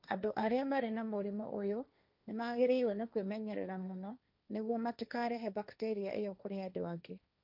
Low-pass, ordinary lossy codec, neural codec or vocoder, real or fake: 5.4 kHz; none; codec, 16 kHz, 1.1 kbps, Voila-Tokenizer; fake